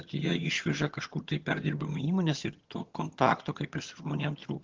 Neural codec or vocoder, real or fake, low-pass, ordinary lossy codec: vocoder, 22.05 kHz, 80 mel bands, HiFi-GAN; fake; 7.2 kHz; Opus, 16 kbps